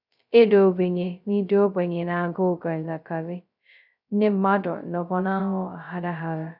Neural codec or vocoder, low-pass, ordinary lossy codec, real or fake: codec, 16 kHz, 0.2 kbps, FocalCodec; 5.4 kHz; none; fake